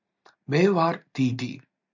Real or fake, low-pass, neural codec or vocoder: real; 7.2 kHz; none